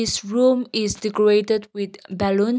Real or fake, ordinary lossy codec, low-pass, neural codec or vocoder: real; none; none; none